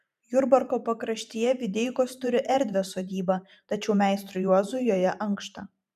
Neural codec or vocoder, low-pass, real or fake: vocoder, 44.1 kHz, 128 mel bands every 512 samples, BigVGAN v2; 14.4 kHz; fake